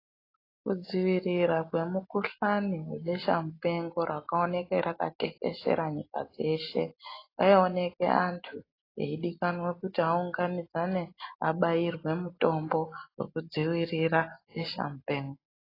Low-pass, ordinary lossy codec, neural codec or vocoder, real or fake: 5.4 kHz; AAC, 24 kbps; none; real